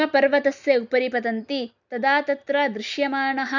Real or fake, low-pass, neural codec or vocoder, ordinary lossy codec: real; 7.2 kHz; none; none